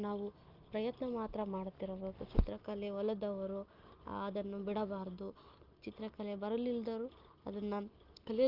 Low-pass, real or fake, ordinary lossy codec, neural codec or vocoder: 5.4 kHz; real; Opus, 32 kbps; none